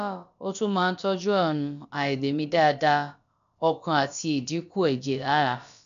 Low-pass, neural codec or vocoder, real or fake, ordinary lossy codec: 7.2 kHz; codec, 16 kHz, about 1 kbps, DyCAST, with the encoder's durations; fake; MP3, 96 kbps